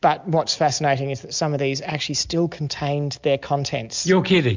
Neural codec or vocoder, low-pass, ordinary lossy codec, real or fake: none; 7.2 kHz; MP3, 64 kbps; real